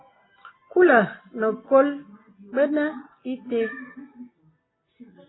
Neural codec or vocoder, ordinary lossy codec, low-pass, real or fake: none; AAC, 16 kbps; 7.2 kHz; real